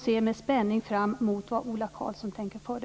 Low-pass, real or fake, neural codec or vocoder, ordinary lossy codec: none; real; none; none